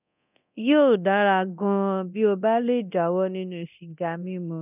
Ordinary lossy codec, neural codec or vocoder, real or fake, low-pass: none; codec, 24 kHz, 0.9 kbps, DualCodec; fake; 3.6 kHz